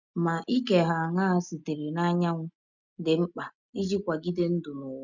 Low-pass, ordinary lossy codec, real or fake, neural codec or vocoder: 7.2 kHz; none; real; none